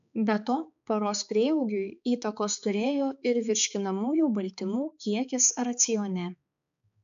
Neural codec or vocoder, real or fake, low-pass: codec, 16 kHz, 4 kbps, X-Codec, HuBERT features, trained on balanced general audio; fake; 7.2 kHz